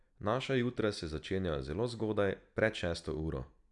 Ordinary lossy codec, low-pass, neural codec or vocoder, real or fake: none; 10.8 kHz; none; real